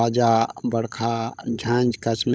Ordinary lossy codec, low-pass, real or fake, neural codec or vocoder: none; none; fake; codec, 16 kHz, 16 kbps, FunCodec, trained on LibriTTS, 50 frames a second